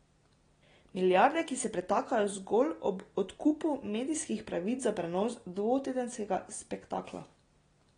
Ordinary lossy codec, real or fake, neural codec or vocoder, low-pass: AAC, 32 kbps; real; none; 9.9 kHz